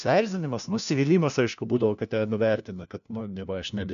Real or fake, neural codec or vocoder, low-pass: fake; codec, 16 kHz, 1 kbps, FunCodec, trained on LibriTTS, 50 frames a second; 7.2 kHz